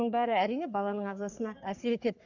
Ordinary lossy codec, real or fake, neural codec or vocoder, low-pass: none; fake; codec, 44.1 kHz, 3.4 kbps, Pupu-Codec; 7.2 kHz